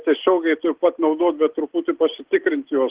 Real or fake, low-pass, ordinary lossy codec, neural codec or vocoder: real; 3.6 kHz; Opus, 16 kbps; none